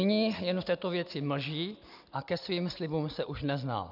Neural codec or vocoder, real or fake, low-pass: none; real; 5.4 kHz